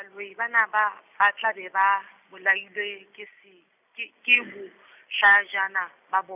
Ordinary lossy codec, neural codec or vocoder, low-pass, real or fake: none; none; 3.6 kHz; real